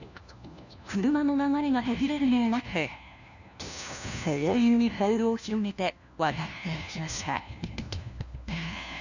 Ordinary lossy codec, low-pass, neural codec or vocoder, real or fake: none; 7.2 kHz; codec, 16 kHz, 1 kbps, FunCodec, trained on LibriTTS, 50 frames a second; fake